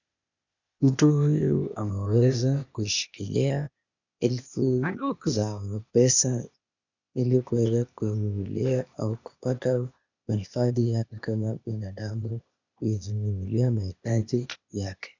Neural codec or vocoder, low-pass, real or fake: codec, 16 kHz, 0.8 kbps, ZipCodec; 7.2 kHz; fake